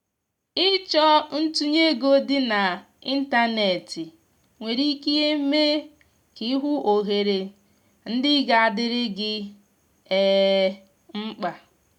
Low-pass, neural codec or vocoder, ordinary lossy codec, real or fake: 19.8 kHz; none; none; real